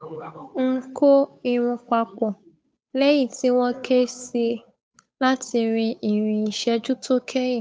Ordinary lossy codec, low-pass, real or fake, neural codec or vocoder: none; none; fake; codec, 16 kHz, 8 kbps, FunCodec, trained on Chinese and English, 25 frames a second